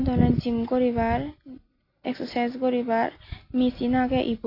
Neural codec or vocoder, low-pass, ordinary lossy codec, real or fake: none; 5.4 kHz; AAC, 32 kbps; real